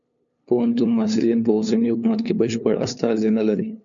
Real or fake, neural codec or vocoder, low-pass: fake; codec, 16 kHz, 2 kbps, FunCodec, trained on LibriTTS, 25 frames a second; 7.2 kHz